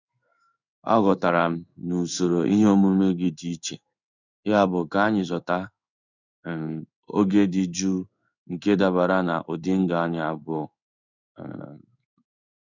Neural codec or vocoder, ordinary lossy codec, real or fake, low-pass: codec, 16 kHz in and 24 kHz out, 1 kbps, XY-Tokenizer; none; fake; 7.2 kHz